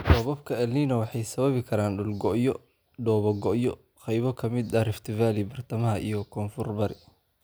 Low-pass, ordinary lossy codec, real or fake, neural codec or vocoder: none; none; real; none